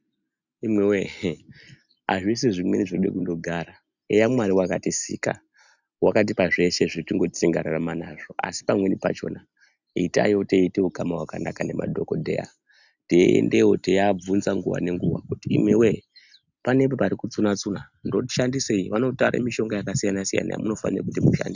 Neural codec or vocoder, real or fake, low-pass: none; real; 7.2 kHz